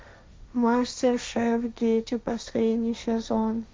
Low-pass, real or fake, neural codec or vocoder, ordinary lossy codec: none; fake; codec, 16 kHz, 1.1 kbps, Voila-Tokenizer; none